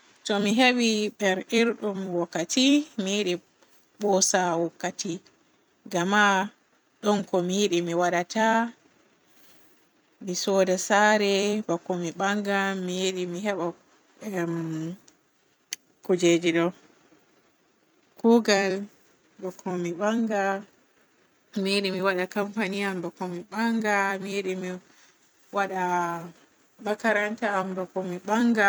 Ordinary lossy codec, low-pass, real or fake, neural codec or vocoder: none; none; fake; vocoder, 44.1 kHz, 128 mel bands every 256 samples, BigVGAN v2